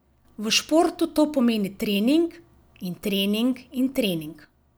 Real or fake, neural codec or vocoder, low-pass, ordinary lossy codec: real; none; none; none